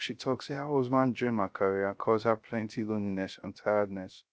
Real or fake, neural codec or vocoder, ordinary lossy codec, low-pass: fake; codec, 16 kHz, 0.3 kbps, FocalCodec; none; none